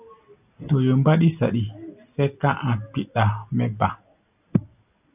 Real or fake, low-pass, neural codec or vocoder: real; 3.6 kHz; none